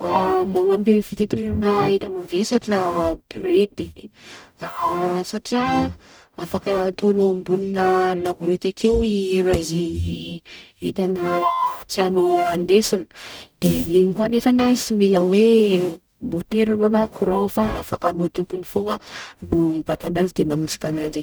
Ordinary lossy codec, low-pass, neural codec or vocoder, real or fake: none; none; codec, 44.1 kHz, 0.9 kbps, DAC; fake